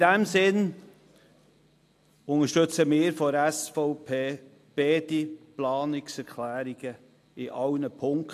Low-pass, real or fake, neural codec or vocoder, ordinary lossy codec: 14.4 kHz; real; none; AAC, 64 kbps